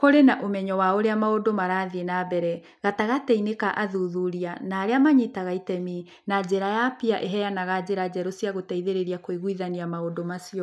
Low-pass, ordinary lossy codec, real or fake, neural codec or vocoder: none; none; real; none